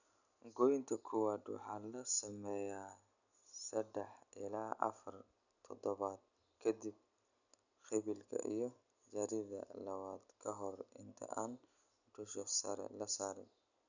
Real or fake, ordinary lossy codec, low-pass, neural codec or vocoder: real; none; 7.2 kHz; none